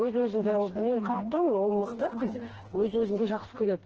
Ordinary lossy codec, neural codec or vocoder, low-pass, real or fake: Opus, 16 kbps; codec, 16 kHz, 2 kbps, FreqCodec, smaller model; 7.2 kHz; fake